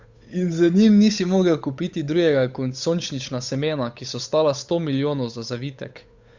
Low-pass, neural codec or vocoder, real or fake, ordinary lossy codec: 7.2 kHz; codec, 16 kHz, 8 kbps, FunCodec, trained on Chinese and English, 25 frames a second; fake; Opus, 64 kbps